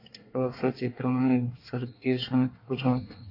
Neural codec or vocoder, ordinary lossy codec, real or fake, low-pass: codec, 24 kHz, 1 kbps, SNAC; AAC, 32 kbps; fake; 5.4 kHz